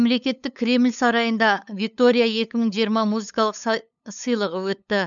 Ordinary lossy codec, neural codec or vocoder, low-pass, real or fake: MP3, 96 kbps; codec, 16 kHz, 8 kbps, FunCodec, trained on LibriTTS, 25 frames a second; 7.2 kHz; fake